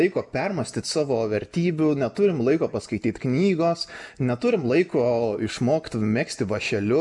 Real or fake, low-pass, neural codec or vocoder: real; 10.8 kHz; none